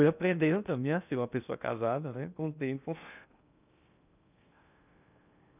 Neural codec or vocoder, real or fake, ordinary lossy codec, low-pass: codec, 16 kHz in and 24 kHz out, 0.8 kbps, FocalCodec, streaming, 65536 codes; fake; none; 3.6 kHz